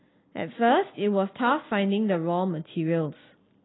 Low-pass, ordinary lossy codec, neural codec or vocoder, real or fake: 7.2 kHz; AAC, 16 kbps; none; real